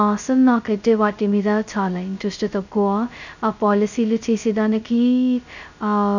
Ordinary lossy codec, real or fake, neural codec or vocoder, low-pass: none; fake; codec, 16 kHz, 0.2 kbps, FocalCodec; 7.2 kHz